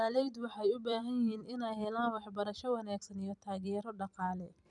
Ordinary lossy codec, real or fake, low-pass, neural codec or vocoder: none; fake; 10.8 kHz; vocoder, 44.1 kHz, 128 mel bands every 256 samples, BigVGAN v2